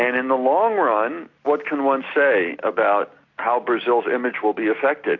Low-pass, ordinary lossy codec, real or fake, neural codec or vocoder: 7.2 kHz; AAC, 48 kbps; real; none